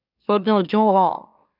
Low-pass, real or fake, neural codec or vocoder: 5.4 kHz; fake; autoencoder, 44.1 kHz, a latent of 192 numbers a frame, MeloTTS